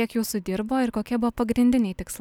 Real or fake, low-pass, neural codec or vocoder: real; 19.8 kHz; none